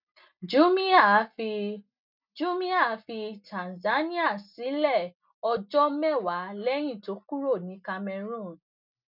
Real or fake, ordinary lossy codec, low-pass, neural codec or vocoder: real; none; 5.4 kHz; none